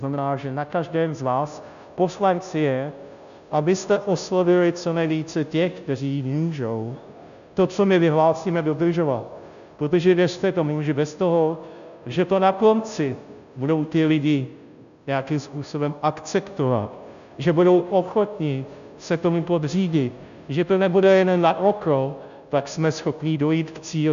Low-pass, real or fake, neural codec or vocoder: 7.2 kHz; fake; codec, 16 kHz, 0.5 kbps, FunCodec, trained on Chinese and English, 25 frames a second